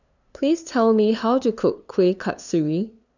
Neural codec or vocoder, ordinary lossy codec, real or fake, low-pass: codec, 16 kHz, 2 kbps, FunCodec, trained on LibriTTS, 25 frames a second; none; fake; 7.2 kHz